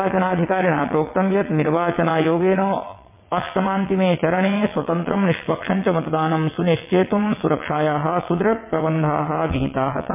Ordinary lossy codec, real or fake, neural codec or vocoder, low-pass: MP3, 32 kbps; fake; vocoder, 22.05 kHz, 80 mel bands, WaveNeXt; 3.6 kHz